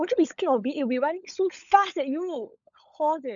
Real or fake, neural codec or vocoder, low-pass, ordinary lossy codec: fake; codec, 16 kHz, 16 kbps, FunCodec, trained on LibriTTS, 50 frames a second; 7.2 kHz; none